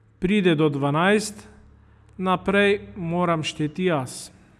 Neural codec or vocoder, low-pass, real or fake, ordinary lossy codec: none; none; real; none